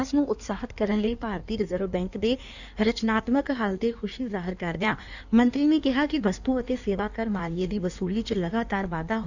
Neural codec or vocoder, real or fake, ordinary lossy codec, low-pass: codec, 16 kHz in and 24 kHz out, 1.1 kbps, FireRedTTS-2 codec; fake; none; 7.2 kHz